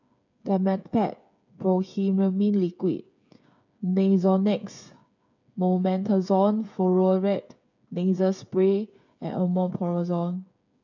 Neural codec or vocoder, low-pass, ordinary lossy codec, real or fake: codec, 16 kHz, 8 kbps, FreqCodec, smaller model; 7.2 kHz; none; fake